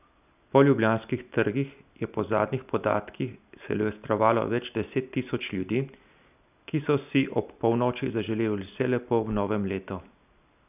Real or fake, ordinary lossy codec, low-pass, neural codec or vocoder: real; none; 3.6 kHz; none